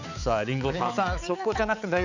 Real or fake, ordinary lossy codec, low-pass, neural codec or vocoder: fake; none; 7.2 kHz; codec, 16 kHz, 4 kbps, X-Codec, HuBERT features, trained on balanced general audio